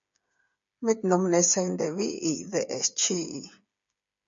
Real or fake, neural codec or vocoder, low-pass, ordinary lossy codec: fake; codec, 16 kHz, 8 kbps, FreqCodec, smaller model; 7.2 kHz; MP3, 48 kbps